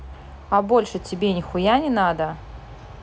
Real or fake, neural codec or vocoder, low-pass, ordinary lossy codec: real; none; none; none